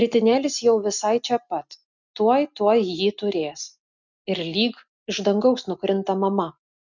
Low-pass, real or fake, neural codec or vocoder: 7.2 kHz; real; none